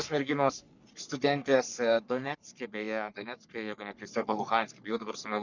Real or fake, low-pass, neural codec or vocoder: fake; 7.2 kHz; codec, 44.1 kHz, 3.4 kbps, Pupu-Codec